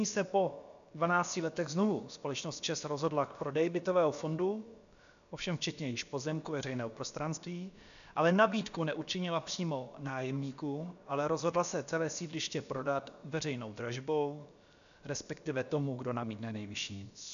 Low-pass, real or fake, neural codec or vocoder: 7.2 kHz; fake; codec, 16 kHz, about 1 kbps, DyCAST, with the encoder's durations